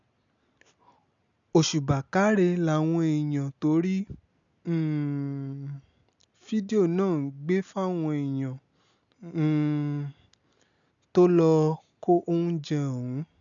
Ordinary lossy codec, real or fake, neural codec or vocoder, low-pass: none; real; none; 7.2 kHz